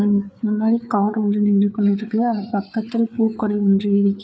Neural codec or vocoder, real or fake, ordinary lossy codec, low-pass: codec, 16 kHz, 4 kbps, FreqCodec, larger model; fake; none; none